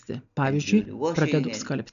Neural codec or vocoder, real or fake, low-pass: none; real; 7.2 kHz